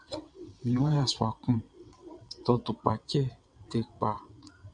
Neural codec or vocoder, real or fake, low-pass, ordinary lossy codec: vocoder, 22.05 kHz, 80 mel bands, WaveNeXt; fake; 9.9 kHz; MP3, 64 kbps